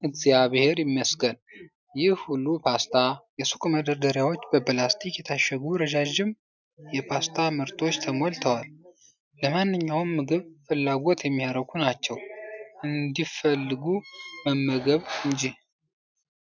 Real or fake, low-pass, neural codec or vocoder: real; 7.2 kHz; none